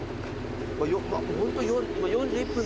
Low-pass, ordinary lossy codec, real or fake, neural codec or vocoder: none; none; real; none